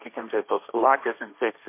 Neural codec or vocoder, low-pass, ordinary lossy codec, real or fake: codec, 16 kHz, 1.1 kbps, Voila-Tokenizer; 3.6 kHz; MP3, 24 kbps; fake